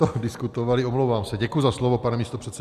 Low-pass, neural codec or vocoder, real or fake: 14.4 kHz; none; real